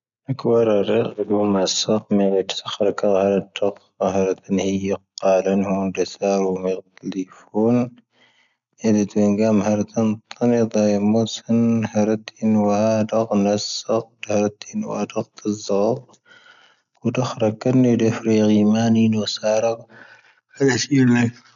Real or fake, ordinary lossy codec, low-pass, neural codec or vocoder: real; none; 7.2 kHz; none